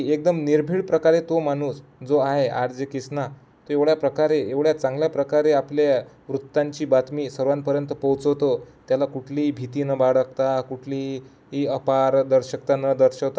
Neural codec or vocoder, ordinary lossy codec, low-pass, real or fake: none; none; none; real